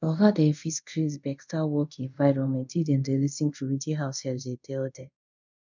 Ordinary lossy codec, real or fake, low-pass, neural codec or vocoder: none; fake; 7.2 kHz; codec, 24 kHz, 0.5 kbps, DualCodec